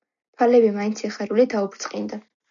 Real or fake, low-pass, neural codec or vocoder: real; 7.2 kHz; none